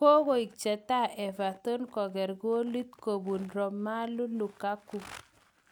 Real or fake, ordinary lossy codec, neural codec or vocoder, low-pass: real; none; none; none